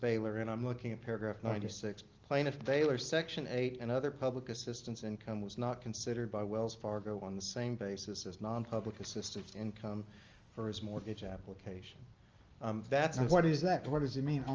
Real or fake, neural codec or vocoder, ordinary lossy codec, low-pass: real; none; Opus, 32 kbps; 7.2 kHz